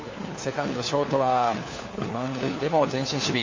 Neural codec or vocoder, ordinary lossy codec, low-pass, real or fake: codec, 16 kHz, 4 kbps, FunCodec, trained on LibriTTS, 50 frames a second; MP3, 32 kbps; 7.2 kHz; fake